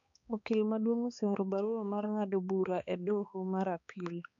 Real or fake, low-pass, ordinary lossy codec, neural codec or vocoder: fake; 7.2 kHz; none; codec, 16 kHz, 2 kbps, X-Codec, HuBERT features, trained on balanced general audio